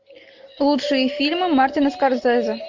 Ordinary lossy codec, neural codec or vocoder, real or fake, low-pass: MP3, 48 kbps; none; real; 7.2 kHz